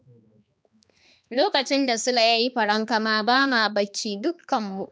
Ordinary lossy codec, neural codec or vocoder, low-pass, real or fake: none; codec, 16 kHz, 2 kbps, X-Codec, HuBERT features, trained on balanced general audio; none; fake